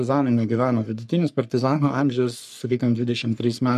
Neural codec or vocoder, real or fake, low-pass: codec, 44.1 kHz, 3.4 kbps, Pupu-Codec; fake; 14.4 kHz